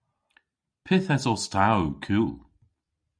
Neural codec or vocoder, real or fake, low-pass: none; real; 9.9 kHz